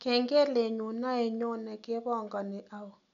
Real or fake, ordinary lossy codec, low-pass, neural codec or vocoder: real; none; 7.2 kHz; none